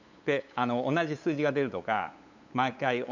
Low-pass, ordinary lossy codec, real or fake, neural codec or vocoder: 7.2 kHz; none; fake; codec, 16 kHz, 8 kbps, FunCodec, trained on LibriTTS, 25 frames a second